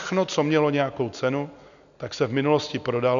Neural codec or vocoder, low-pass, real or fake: none; 7.2 kHz; real